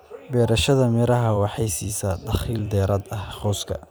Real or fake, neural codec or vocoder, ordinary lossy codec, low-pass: fake; vocoder, 44.1 kHz, 128 mel bands every 256 samples, BigVGAN v2; none; none